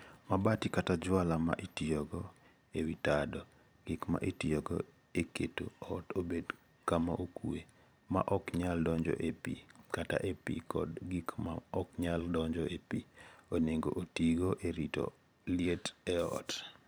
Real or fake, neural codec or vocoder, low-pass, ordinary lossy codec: fake; vocoder, 44.1 kHz, 128 mel bands every 512 samples, BigVGAN v2; none; none